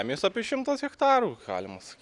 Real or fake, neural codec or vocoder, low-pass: real; none; 10.8 kHz